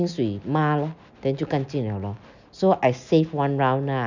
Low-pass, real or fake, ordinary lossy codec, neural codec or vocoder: 7.2 kHz; real; none; none